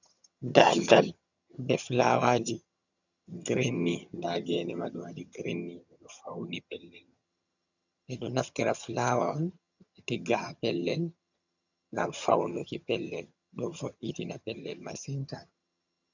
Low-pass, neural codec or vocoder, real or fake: 7.2 kHz; vocoder, 22.05 kHz, 80 mel bands, HiFi-GAN; fake